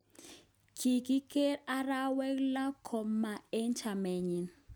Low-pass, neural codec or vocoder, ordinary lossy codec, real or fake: none; none; none; real